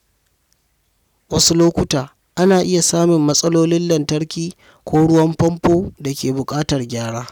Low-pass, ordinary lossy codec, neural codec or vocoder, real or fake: 19.8 kHz; none; none; real